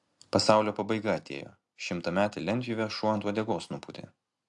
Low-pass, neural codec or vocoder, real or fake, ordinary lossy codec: 10.8 kHz; none; real; AAC, 64 kbps